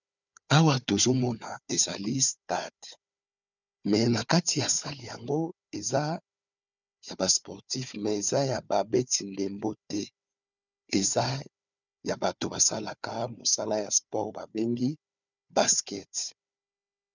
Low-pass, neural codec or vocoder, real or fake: 7.2 kHz; codec, 16 kHz, 4 kbps, FunCodec, trained on Chinese and English, 50 frames a second; fake